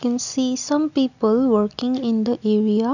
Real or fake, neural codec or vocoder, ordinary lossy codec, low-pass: real; none; none; 7.2 kHz